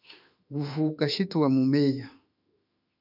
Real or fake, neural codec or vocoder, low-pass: fake; autoencoder, 48 kHz, 32 numbers a frame, DAC-VAE, trained on Japanese speech; 5.4 kHz